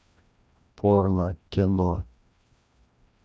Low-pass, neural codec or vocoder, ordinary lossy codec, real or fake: none; codec, 16 kHz, 1 kbps, FreqCodec, larger model; none; fake